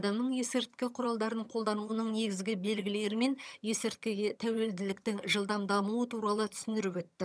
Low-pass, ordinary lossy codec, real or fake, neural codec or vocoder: none; none; fake; vocoder, 22.05 kHz, 80 mel bands, HiFi-GAN